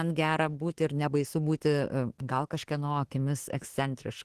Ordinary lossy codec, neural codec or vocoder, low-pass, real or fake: Opus, 16 kbps; autoencoder, 48 kHz, 32 numbers a frame, DAC-VAE, trained on Japanese speech; 14.4 kHz; fake